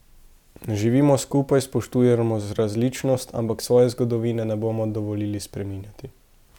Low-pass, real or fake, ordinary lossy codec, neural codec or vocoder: 19.8 kHz; real; none; none